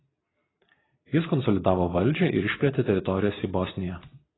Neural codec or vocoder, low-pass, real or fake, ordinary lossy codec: none; 7.2 kHz; real; AAC, 16 kbps